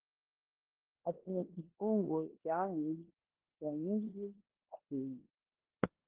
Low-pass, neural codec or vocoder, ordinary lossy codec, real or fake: 3.6 kHz; codec, 16 kHz in and 24 kHz out, 0.9 kbps, LongCat-Audio-Codec, four codebook decoder; Opus, 16 kbps; fake